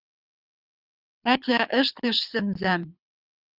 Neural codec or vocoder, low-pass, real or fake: codec, 24 kHz, 6 kbps, HILCodec; 5.4 kHz; fake